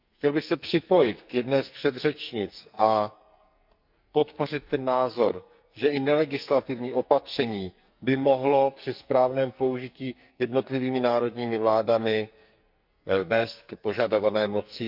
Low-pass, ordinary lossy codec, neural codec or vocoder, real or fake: 5.4 kHz; Opus, 64 kbps; codec, 44.1 kHz, 2.6 kbps, SNAC; fake